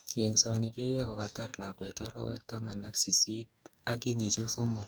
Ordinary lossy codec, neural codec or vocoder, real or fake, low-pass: none; codec, 44.1 kHz, 2.6 kbps, DAC; fake; none